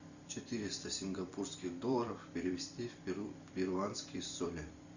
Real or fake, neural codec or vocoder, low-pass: fake; vocoder, 44.1 kHz, 128 mel bands every 256 samples, BigVGAN v2; 7.2 kHz